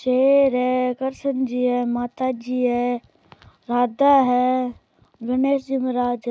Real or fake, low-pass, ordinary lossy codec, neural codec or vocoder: real; none; none; none